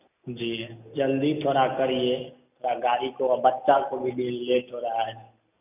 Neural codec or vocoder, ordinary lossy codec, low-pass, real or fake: none; none; 3.6 kHz; real